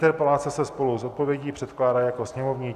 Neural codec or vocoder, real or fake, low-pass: none; real; 14.4 kHz